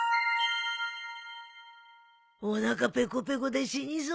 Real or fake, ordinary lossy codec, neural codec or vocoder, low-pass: real; none; none; none